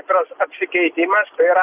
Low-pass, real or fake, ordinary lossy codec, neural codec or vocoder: 3.6 kHz; real; Opus, 24 kbps; none